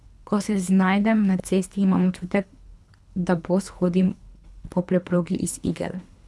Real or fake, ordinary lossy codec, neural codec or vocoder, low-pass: fake; none; codec, 24 kHz, 3 kbps, HILCodec; none